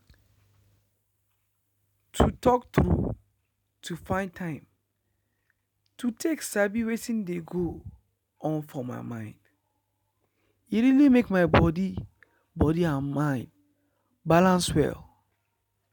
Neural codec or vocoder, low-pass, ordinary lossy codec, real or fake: none; 19.8 kHz; none; real